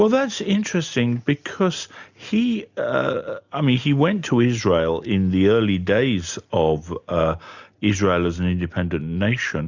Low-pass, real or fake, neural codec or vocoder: 7.2 kHz; real; none